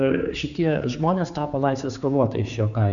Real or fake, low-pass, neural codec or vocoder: fake; 7.2 kHz; codec, 16 kHz, 4 kbps, X-Codec, HuBERT features, trained on general audio